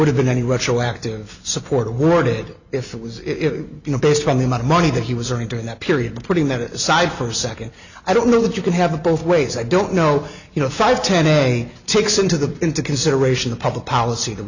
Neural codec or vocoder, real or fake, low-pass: none; real; 7.2 kHz